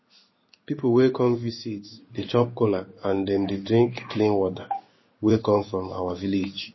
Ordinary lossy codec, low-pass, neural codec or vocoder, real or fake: MP3, 24 kbps; 7.2 kHz; codec, 16 kHz in and 24 kHz out, 1 kbps, XY-Tokenizer; fake